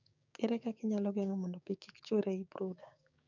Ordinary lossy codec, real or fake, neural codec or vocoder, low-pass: none; fake; codec, 16 kHz, 6 kbps, DAC; 7.2 kHz